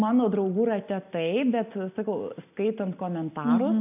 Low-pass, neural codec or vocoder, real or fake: 3.6 kHz; none; real